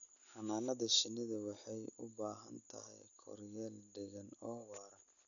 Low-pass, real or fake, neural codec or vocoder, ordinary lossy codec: 7.2 kHz; real; none; none